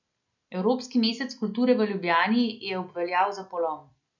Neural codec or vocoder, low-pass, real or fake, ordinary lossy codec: none; 7.2 kHz; real; none